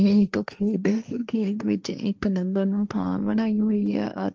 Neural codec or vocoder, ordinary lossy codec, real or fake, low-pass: codec, 16 kHz, 1.1 kbps, Voila-Tokenizer; Opus, 24 kbps; fake; 7.2 kHz